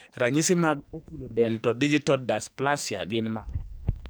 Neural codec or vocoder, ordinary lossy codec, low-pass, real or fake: codec, 44.1 kHz, 2.6 kbps, SNAC; none; none; fake